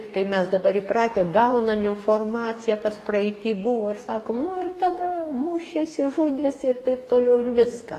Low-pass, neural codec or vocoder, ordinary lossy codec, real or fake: 14.4 kHz; codec, 44.1 kHz, 2.6 kbps, DAC; AAC, 48 kbps; fake